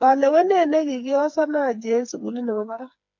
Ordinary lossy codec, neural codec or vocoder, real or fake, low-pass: MP3, 64 kbps; codec, 16 kHz, 4 kbps, FreqCodec, smaller model; fake; 7.2 kHz